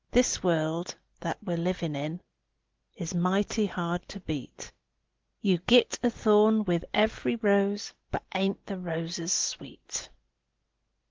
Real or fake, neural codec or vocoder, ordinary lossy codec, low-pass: real; none; Opus, 16 kbps; 7.2 kHz